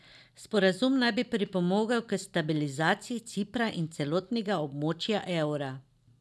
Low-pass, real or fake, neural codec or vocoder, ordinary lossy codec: none; real; none; none